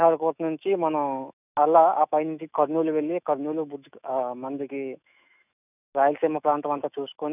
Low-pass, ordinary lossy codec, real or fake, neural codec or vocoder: 3.6 kHz; none; fake; autoencoder, 48 kHz, 128 numbers a frame, DAC-VAE, trained on Japanese speech